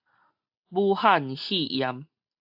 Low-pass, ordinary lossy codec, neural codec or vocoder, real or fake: 5.4 kHz; AAC, 48 kbps; none; real